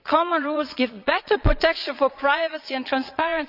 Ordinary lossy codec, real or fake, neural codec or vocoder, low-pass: none; fake; vocoder, 22.05 kHz, 80 mel bands, Vocos; 5.4 kHz